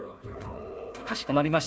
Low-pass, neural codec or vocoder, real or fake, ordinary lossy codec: none; codec, 16 kHz, 1 kbps, FunCodec, trained on Chinese and English, 50 frames a second; fake; none